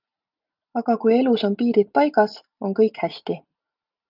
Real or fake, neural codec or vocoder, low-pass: real; none; 5.4 kHz